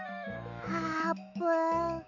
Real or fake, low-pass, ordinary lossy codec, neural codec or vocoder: fake; 7.2 kHz; none; autoencoder, 48 kHz, 128 numbers a frame, DAC-VAE, trained on Japanese speech